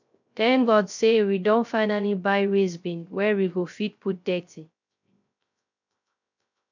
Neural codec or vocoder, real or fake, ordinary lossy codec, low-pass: codec, 16 kHz, 0.3 kbps, FocalCodec; fake; none; 7.2 kHz